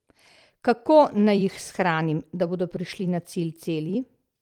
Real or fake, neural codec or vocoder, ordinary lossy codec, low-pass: fake; vocoder, 44.1 kHz, 128 mel bands every 256 samples, BigVGAN v2; Opus, 24 kbps; 19.8 kHz